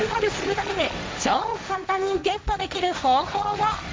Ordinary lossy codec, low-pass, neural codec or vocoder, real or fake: none; none; codec, 16 kHz, 1.1 kbps, Voila-Tokenizer; fake